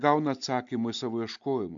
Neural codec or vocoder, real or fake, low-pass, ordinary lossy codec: none; real; 7.2 kHz; MP3, 64 kbps